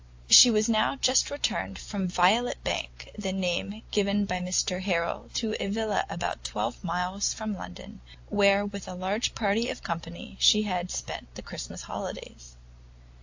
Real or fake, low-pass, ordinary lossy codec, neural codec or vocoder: real; 7.2 kHz; AAC, 48 kbps; none